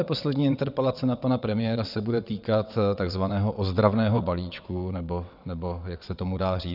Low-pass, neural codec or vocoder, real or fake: 5.4 kHz; vocoder, 22.05 kHz, 80 mel bands, WaveNeXt; fake